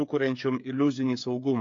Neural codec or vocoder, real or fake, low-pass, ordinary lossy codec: codec, 16 kHz, 8 kbps, FreqCodec, smaller model; fake; 7.2 kHz; AAC, 48 kbps